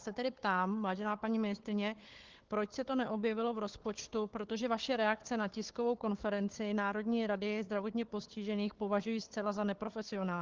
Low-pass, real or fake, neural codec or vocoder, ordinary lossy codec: 7.2 kHz; fake; codec, 16 kHz, 4 kbps, FunCodec, trained on Chinese and English, 50 frames a second; Opus, 16 kbps